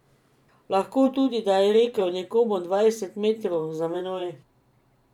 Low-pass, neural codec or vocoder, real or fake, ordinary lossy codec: 19.8 kHz; vocoder, 44.1 kHz, 128 mel bands every 512 samples, BigVGAN v2; fake; none